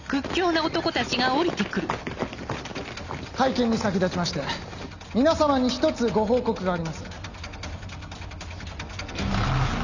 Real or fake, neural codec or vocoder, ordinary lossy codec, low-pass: real; none; none; 7.2 kHz